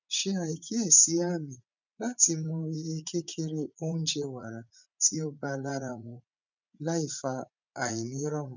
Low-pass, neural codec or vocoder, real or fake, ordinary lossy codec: 7.2 kHz; vocoder, 44.1 kHz, 80 mel bands, Vocos; fake; none